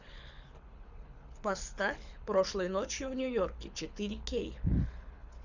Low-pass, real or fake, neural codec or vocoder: 7.2 kHz; fake; codec, 24 kHz, 6 kbps, HILCodec